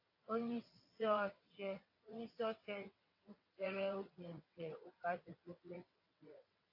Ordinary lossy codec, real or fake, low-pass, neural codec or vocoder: AAC, 32 kbps; fake; 5.4 kHz; vocoder, 22.05 kHz, 80 mel bands, WaveNeXt